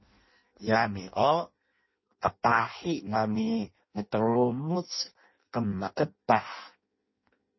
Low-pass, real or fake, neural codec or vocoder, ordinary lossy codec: 7.2 kHz; fake; codec, 16 kHz in and 24 kHz out, 0.6 kbps, FireRedTTS-2 codec; MP3, 24 kbps